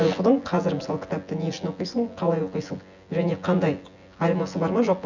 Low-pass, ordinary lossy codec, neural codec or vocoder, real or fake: 7.2 kHz; none; vocoder, 24 kHz, 100 mel bands, Vocos; fake